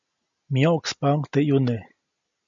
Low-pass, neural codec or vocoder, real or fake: 7.2 kHz; none; real